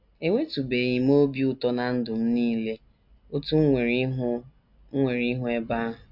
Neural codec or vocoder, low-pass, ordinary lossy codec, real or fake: none; 5.4 kHz; none; real